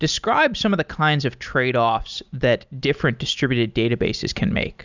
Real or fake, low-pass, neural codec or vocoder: real; 7.2 kHz; none